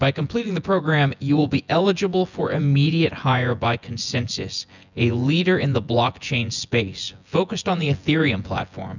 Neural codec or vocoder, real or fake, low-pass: vocoder, 24 kHz, 100 mel bands, Vocos; fake; 7.2 kHz